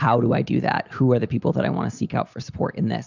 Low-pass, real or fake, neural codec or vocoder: 7.2 kHz; real; none